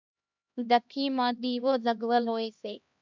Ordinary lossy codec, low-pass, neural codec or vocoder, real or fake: MP3, 64 kbps; 7.2 kHz; codec, 16 kHz, 1 kbps, X-Codec, HuBERT features, trained on LibriSpeech; fake